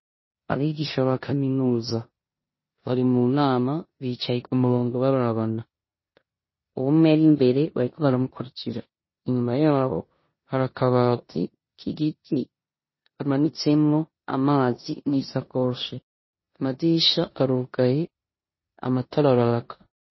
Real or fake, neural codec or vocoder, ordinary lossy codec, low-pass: fake; codec, 16 kHz in and 24 kHz out, 0.9 kbps, LongCat-Audio-Codec, four codebook decoder; MP3, 24 kbps; 7.2 kHz